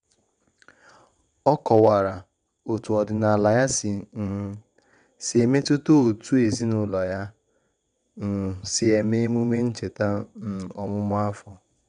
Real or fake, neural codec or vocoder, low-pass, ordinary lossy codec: fake; vocoder, 22.05 kHz, 80 mel bands, WaveNeXt; 9.9 kHz; none